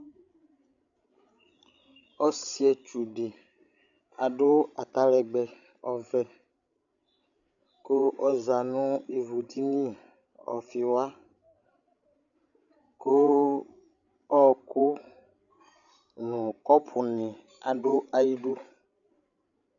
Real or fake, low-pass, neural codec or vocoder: fake; 7.2 kHz; codec, 16 kHz, 16 kbps, FreqCodec, larger model